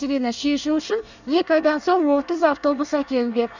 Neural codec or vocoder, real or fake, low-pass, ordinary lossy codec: codec, 24 kHz, 1 kbps, SNAC; fake; 7.2 kHz; none